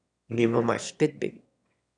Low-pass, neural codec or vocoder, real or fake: 9.9 kHz; autoencoder, 22.05 kHz, a latent of 192 numbers a frame, VITS, trained on one speaker; fake